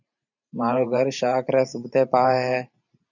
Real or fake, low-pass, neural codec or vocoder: fake; 7.2 kHz; vocoder, 44.1 kHz, 128 mel bands every 512 samples, BigVGAN v2